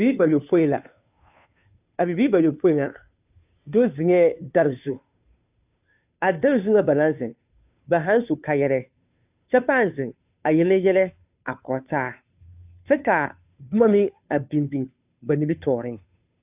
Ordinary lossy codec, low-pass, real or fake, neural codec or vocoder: AAC, 32 kbps; 3.6 kHz; fake; codec, 16 kHz, 2 kbps, FunCodec, trained on Chinese and English, 25 frames a second